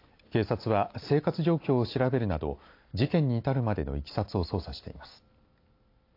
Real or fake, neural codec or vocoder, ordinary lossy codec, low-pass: real; none; AAC, 32 kbps; 5.4 kHz